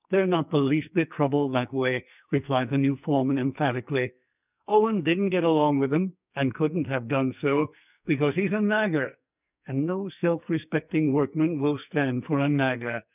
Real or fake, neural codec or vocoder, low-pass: fake; codec, 44.1 kHz, 2.6 kbps, SNAC; 3.6 kHz